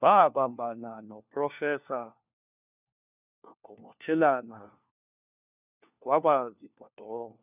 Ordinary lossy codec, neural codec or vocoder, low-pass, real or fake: none; codec, 16 kHz, 1 kbps, FunCodec, trained on LibriTTS, 50 frames a second; 3.6 kHz; fake